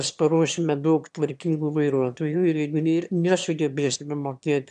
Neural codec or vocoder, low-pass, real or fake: autoencoder, 22.05 kHz, a latent of 192 numbers a frame, VITS, trained on one speaker; 9.9 kHz; fake